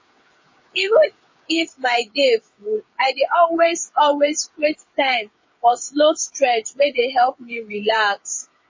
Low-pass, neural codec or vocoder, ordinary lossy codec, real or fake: 7.2 kHz; codec, 16 kHz, 8 kbps, FreqCodec, smaller model; MP3, 32 kbps; fake